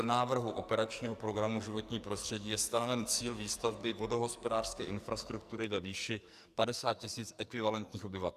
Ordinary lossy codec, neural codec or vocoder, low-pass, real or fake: Opus, 64 kbps; codec, 44.1 kHz, 2.6 kbps, SNAC; 14.4 kHz; fake